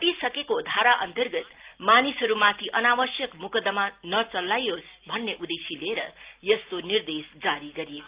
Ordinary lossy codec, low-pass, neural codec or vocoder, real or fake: Opus, 32 kbps; 3.6 kHz; none; real